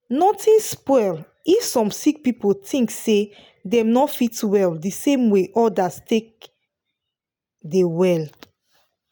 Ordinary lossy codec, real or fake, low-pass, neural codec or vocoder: none; real; none; none